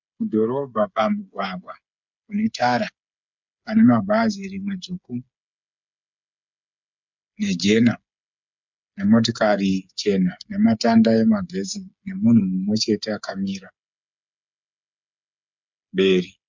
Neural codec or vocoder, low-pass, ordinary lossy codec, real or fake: codec, 16 kHz, 8 kbps, FreqCodec, smaller model; 7.2 kHz; MP3, 64 kbps; fake